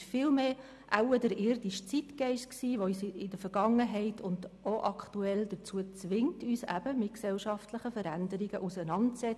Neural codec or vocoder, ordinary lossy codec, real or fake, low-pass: none; none; real; none